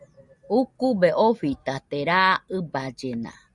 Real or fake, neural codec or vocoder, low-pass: real; none; 10.8 kHz